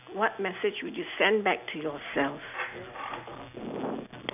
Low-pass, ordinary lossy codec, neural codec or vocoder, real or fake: 3.6 kHz; none; none; real